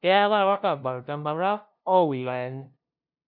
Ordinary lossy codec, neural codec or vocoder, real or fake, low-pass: none; codec, 16 kHz, 0.5 kbps, FunCodec, trained on LibriTTS, 25 frames a second; fake; 5.4 kHz